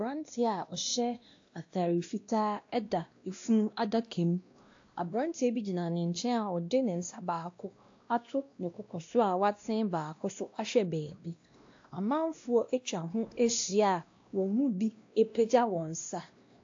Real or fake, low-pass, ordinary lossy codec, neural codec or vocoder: fake; 7.2 kHz; AAC, 48 kbps; codec, 16 kHz, 1 kbps, X-Codec, WavLM features, trained on Multilingual LibriSpeech